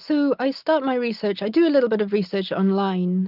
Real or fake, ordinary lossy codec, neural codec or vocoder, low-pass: real; Opus, 32 kbps; none; 5.4 kHz